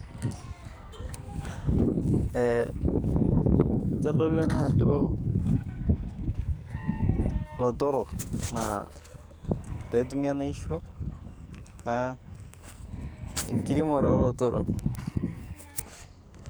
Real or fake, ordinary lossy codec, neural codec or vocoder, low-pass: fake; none; codec, 44.1 kHz, 2.6 kbps, SNAC; none